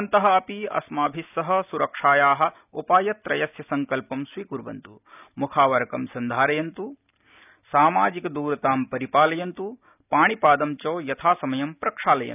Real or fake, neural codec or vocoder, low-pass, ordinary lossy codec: real; none; 3.6 kHz; none